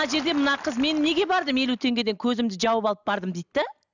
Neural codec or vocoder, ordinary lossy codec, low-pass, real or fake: none; none; 7.2 kHz; real